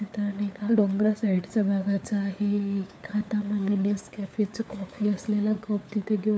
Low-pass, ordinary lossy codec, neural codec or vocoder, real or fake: none; none; codec, 16 kHz, 4 kbps, FunCodec, trained on LibriTTS, 50 frames a second; fake